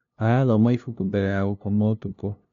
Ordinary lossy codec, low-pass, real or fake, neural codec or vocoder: none; 7.2 kHz; fake; codec, 16 kHz, 0.5 kbps, FunCodec, trained on LibriTTS, 25 frames a second